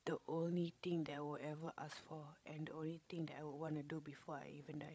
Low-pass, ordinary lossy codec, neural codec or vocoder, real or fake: none; none; codec, 16 kHz, 16 kbps, FreqCodec, larger model; fake